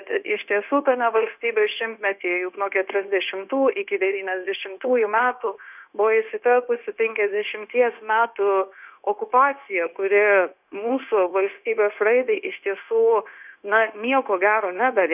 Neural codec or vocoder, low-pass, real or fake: codec, 16 kHz, 0.9 kbps, LongCat-Audio-Codec; 3.6 kHz; fake